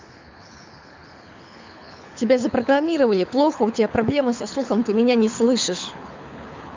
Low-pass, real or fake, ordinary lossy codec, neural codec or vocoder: 7.2 kHz; fake; MP3, 64 kbps; codec, 24 kHz, 6 kbps, HILCodec